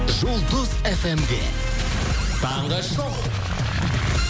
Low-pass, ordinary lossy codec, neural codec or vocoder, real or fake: none; none; none; real